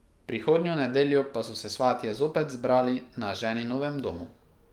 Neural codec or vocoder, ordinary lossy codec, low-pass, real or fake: codec, 44.1 kHz, 7.8 kbps, DAC; Opus, 32 kbps; 19.8 kHz; fake